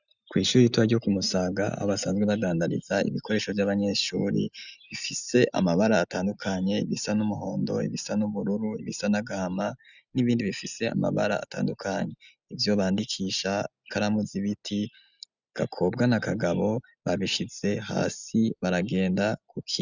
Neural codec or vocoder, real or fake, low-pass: none; real; 7.2 kHz